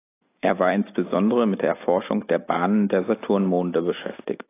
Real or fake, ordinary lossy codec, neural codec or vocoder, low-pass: real; AAC, 24 kbps; none; 3.6 kHz